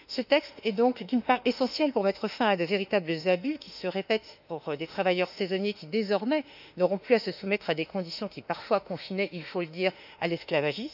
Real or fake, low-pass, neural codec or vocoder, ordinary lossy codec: fake; 5.4 kHz; autoencoder, 48 kHz, 32 numbers a frame, DAC-VAE, trained on Japanese speech; none